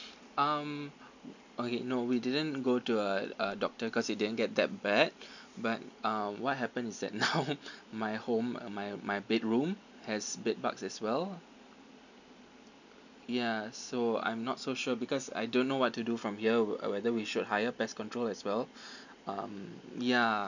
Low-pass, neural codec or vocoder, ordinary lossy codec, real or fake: 7.2 kHz; none; none; real